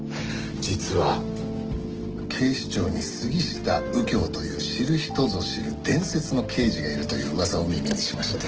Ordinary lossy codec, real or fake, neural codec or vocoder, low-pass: Opus, 16 kbps; real; none; 7.2 kHz